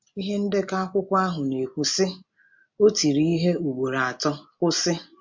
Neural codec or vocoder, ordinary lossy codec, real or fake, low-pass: none; MP3, 48 kbps; real; 7.2 kHz